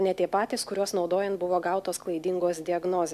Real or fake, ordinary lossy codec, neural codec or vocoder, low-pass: real; AAC, 96 kbps; none; 14.4 kHz